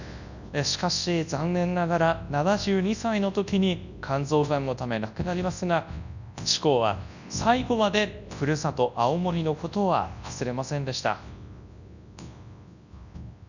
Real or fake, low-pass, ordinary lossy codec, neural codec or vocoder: fake; 7.2 kHz; none; codec, 24 kHz, 0.9 kbps, WavTokenizer, large speech release